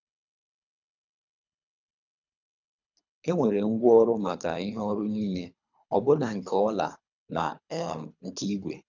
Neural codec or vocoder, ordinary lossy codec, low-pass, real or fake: codec, 24 kHz, 3 kbps, HILCodec; none; 7.2 kHz; fake